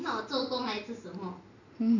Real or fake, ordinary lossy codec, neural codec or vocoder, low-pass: real; none; none; 7.2 kHz